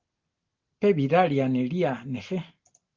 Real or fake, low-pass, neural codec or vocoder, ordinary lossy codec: real; 7.2 kHz; none; Opus, 16 kbps